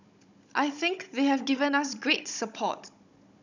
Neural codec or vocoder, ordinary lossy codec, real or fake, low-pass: codec, 16 kHz, 16 kbps, FunCodec, trained on Chinese and English, 50 frames a second; none; fake; 7.2 kHz